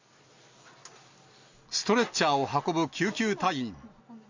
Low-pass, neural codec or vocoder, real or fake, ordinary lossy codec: 7.2 kHz; none; real; MP3, 48 kbps